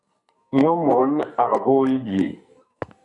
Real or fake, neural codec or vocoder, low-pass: fake; codec, 44.1 kHz, 2.6 kbps, SNAC; 10.8 kHz